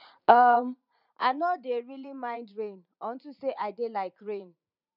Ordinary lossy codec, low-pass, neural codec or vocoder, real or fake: none; 5.4 kHz; vocoder, 44.1 kHz, 80 mel bands, Vocos; fake